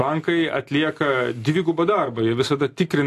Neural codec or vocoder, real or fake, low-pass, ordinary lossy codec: none; real; 14.4 kHz; AAC, 64 kbps